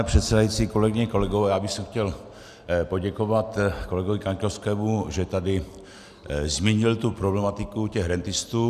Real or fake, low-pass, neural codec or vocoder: real; 14.4 kHz; none